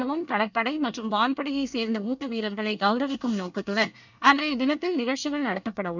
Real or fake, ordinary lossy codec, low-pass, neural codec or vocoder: fake; none; 7.2 kHz; codec, 24 kHz, 1 kbps, SNAC